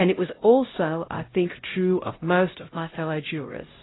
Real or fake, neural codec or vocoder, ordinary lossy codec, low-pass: fake; codec, 16 kHz, 0.5 kbps, X-Codec, HuBERT features, trained on LibriSpeech; AAC, 16 kbps; 7.2 kHz